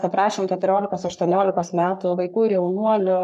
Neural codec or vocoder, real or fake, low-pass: codec, 44.1 kHz, 3.4 kbps, Pupu-Codec; fake; 14.4 kHz